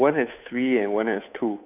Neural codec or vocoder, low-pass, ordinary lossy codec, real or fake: codec, 16 kHz, 2 kbps, FunCodec, trained on Chinese and English, 25 frames a second; 3.6 kHz; none; fake